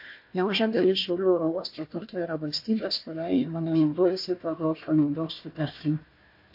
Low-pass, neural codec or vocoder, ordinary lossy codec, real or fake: 5.4 kHz; codec, 16 kHz, 1 kbps, FunCodec, trained on Chinese and English, 50 frames a second; MP3, 32 kbps; fake